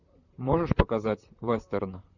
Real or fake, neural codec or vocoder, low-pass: fake; vocoder, 44.1 kHz, 128 mel bands, Pupu-Vocoder; 7.2 kHz